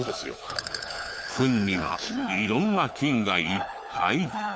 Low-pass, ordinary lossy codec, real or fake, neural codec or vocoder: none; none; fake; codec, 16 kHz, 4 kbps, FunCodec, trained on LibriTTS, 50 frames a second